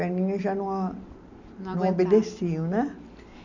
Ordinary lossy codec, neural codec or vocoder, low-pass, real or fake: none; none; 7.2 kHz; real